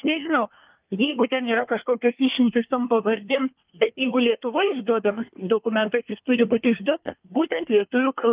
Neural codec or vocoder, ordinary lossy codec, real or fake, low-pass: codec, 24 kHz, 1 kbps, SNAC; Opus, 24 kbps; fake; 3.6 kHz